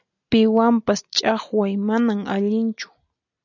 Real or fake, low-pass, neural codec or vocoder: real; 7.2 kHz; none